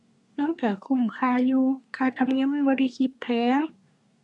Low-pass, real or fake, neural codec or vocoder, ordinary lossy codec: 10.8 kHz; fake; codec, 24 kHz, 1 kbps, SNAC; none